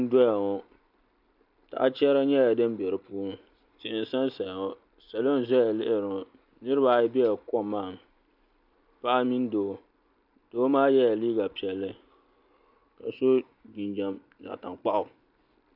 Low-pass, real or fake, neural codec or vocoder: 5.4 kHz; real; none